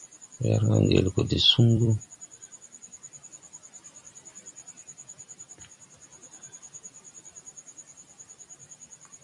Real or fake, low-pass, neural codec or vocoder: fake; 10.8 kHz; vocoder, 44.1 kHz, 128 mel bands every 256 samples, BigVGAN v2